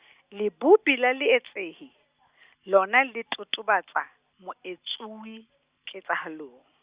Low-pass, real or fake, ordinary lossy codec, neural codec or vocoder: 3.6 kHz; real; Opus, 64 kbps; none